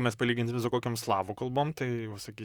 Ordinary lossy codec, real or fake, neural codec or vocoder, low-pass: Opus, 64 kbps; fake; vocoder, 44.1 kHz, 128 mel bands, Pupu-Vocoder; 19.8 kHz